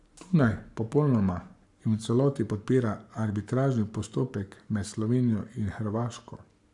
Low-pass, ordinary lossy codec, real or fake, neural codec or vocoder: 10.8 kHz; none; fake; codec, 44.1 kHz, 7.8 kbps, Pupu-Codec